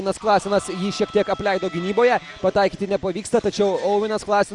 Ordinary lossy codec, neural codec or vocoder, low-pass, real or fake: Opus, 64 kbps; none; 10.8 kHz; real